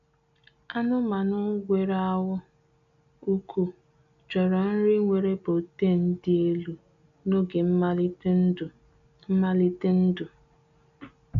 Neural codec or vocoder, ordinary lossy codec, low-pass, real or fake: none; AAC, 64 kbps; 7.2 kHz; real